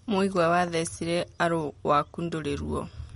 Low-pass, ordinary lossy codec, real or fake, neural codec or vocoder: 19.8 kHz; MP3, 48 kbps; real; none